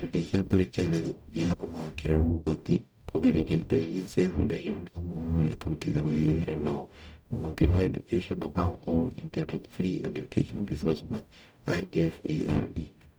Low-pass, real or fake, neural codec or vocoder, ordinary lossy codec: none; fake; codec, 44.1 kHz, 0.9 kbps, DAC; none